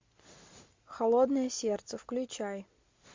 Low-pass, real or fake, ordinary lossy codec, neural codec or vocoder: 7.2 kHz; real; MP3, 48 kbps; none